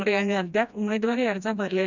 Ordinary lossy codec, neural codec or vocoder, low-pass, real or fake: none; codec, 16 kHz, 1 kbps, FreqCodec, smaller model; 7.2 kHz; fake